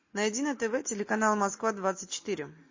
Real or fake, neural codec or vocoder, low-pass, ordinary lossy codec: real; none; 7.2 kHz; MP3, 32 kbps